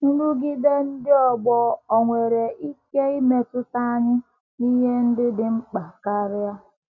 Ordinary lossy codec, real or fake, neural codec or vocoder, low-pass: MP3, 48 kbps; real; none; 7.2 kHz